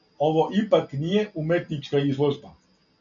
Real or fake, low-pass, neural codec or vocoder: real; 7.2 kHz; none